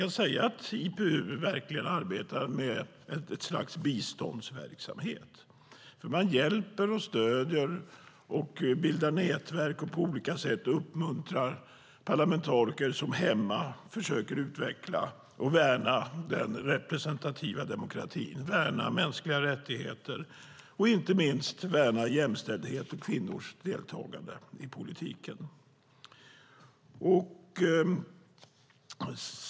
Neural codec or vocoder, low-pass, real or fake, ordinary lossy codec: none; none; real; none